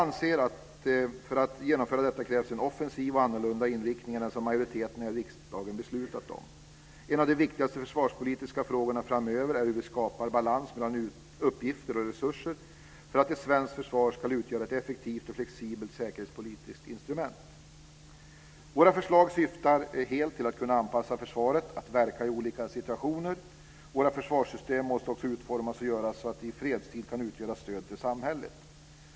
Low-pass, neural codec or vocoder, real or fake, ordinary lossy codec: none; none; real; none